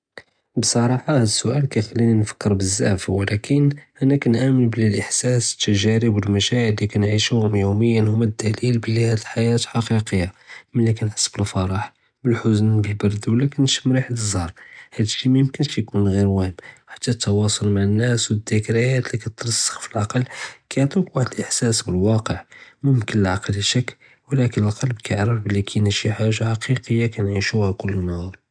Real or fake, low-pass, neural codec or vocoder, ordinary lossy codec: real; 9.9 kHz; none; none